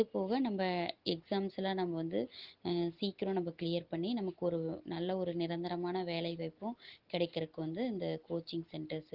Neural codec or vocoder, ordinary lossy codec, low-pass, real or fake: none; Opus, 16 kbps; 5.4 kHz; real